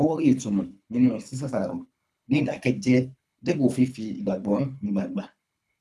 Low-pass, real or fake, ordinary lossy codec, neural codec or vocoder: none; fake; none; codec, 24 kHz, 3 kbps, HILCodec